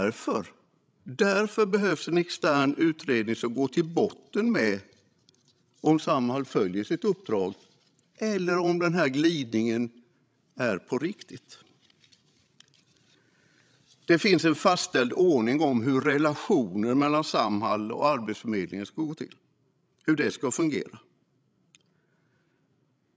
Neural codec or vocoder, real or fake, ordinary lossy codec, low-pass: codec, 16 kHz, 16 kbps, FreqCodec, larger model; fake; none; none